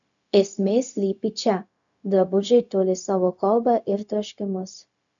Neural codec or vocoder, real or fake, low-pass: codec, 16 kHz, 0.4 kbps, LongCat-Audio-Codec; fake; 7.2 kHz